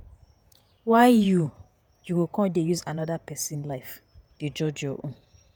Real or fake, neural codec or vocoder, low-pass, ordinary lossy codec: fake; vocoder, 48 kHz, 128 mel bands, Vocos; none; none